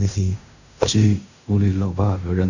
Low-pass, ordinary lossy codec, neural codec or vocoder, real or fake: 7.2 kHz; none; codec, 16 kHz in and 24 kHz out, 0.4 kbps, LongCat-Audio-Codec, fine tuned four codebook decoder; fake